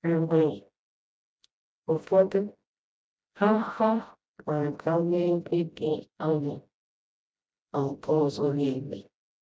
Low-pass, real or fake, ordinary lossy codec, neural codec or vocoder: none; fake; none; codec, 16 kHz, 0.5 kbps, FreqCodec, smaller model